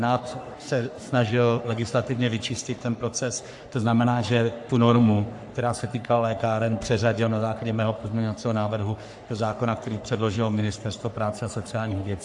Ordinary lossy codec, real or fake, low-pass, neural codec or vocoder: AAC, 64 kbps; fake; 10.8 kHz; codec, 44.1 kHz, 3.4 kbps, Pupu-Codec